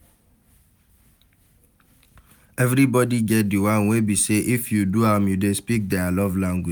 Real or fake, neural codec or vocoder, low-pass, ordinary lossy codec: real; none; none; none